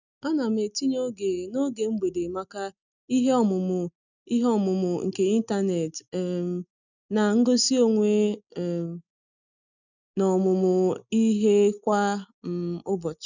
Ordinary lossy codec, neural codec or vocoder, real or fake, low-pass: none; none; real; 7.2 kHz